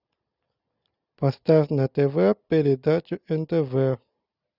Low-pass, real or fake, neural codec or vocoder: 5.4 kHz; real; none